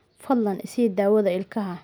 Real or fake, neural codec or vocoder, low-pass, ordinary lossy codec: real; none; none; none